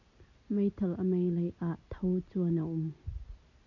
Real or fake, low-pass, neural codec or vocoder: real; 7.2 kHz; none